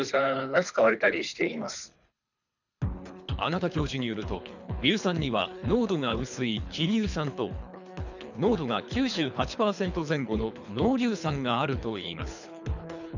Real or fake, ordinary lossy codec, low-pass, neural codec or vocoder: fake; none; 7.2 kHz; codec, 24 kHz, 3 kbps, HILCodec